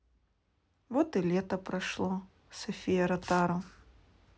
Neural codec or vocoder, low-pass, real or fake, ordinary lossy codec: none; none; real; none